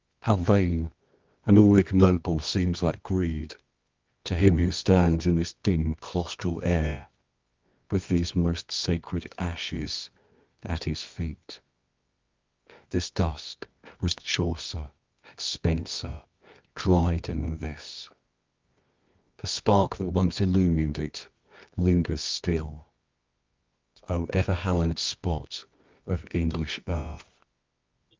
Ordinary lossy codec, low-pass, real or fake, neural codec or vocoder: Opus, 24 kbps; 7.2 kHz; fake; codec, 24 kHz, 0.9 kbps, WavTokenizer, medium music audio release